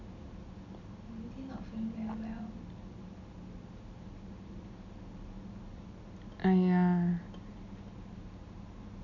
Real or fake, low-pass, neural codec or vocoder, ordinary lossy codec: real; 7.2 kHz; none; none